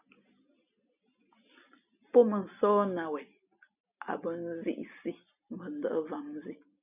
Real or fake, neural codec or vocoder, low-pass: real; none; 3.6 kHz